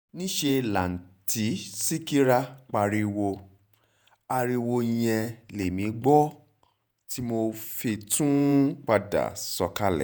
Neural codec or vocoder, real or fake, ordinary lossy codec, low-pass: vocoder, 48 kHz, 128 mel bands, Vocos; fake; none; none